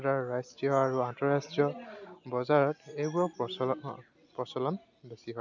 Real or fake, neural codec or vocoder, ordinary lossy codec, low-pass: real; none; none; 7.2 kHz